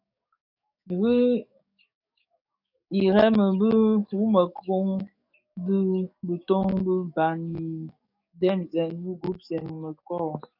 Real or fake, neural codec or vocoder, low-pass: fake; codec, 44.1 kHz, 7.8 kbps, DAC; 5.4 kHz